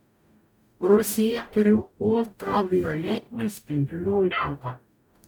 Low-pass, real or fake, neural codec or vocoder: 19.8 kHz; fake; codec, 44.1 kHz, 0.9 kbps, DAC